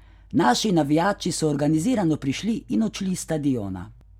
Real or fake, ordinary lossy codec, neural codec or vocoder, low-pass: real; none; none; 19.8 kHz